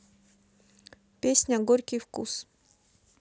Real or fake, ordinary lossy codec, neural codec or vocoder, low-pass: real; none; none; none